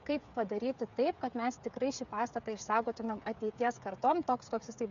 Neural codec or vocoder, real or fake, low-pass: codec, 16 kHz, 8 kbps, FreqCodec, smaller model; fake; 7.2 kHz